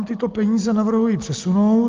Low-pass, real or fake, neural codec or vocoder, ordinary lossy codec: 7.2 kHz; real; none; Opus, 24 kbps